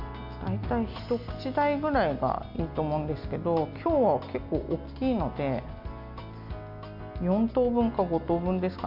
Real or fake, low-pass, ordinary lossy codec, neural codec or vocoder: real; 5.4 kHz; none; none